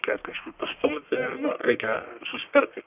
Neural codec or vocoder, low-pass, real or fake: codec, 44.1 kHz, 1.7 kbps, Pupu-Codec; 3.6 kHz; fake